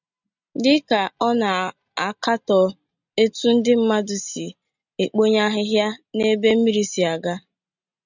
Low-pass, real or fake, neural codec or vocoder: 7.2 kHz; real; none